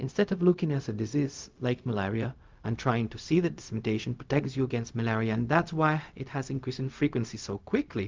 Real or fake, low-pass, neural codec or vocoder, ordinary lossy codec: fake; 7.2 kHz; codec, 16 kHz, 0.4 kbps, LongCat-Audio-Codec; Opus, 32 kbps